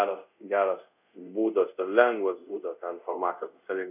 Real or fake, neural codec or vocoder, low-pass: fake; codec, 24 kHz, 0.5 kbps, DualCodec; 3.6 kHz